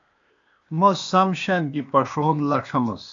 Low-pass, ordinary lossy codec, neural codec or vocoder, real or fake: 7.2 kHz; AAC, 64 kbps; codec, 16 kHz, 0.8 kbps, ZipCodec; fake